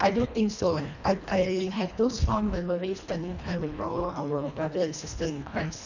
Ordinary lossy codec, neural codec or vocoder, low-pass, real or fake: none; codec, 24 kHz, 1.5 kbps, HILCodec; 7.2 kHz; fake